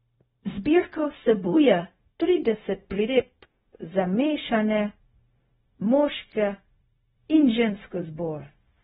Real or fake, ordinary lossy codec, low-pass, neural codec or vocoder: fake; AAC, 16 kbps; 7.2 kHz; codec, 16 kHz, 0.4 kbps, LongCat-Audio-Codec